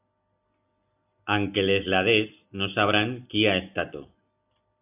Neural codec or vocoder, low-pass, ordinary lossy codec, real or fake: none; 3.6 kHz; AAC, 32 kbps; real